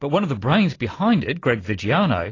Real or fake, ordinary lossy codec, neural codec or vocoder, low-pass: real; AAC, 32 kbps; none; 7.2 kHz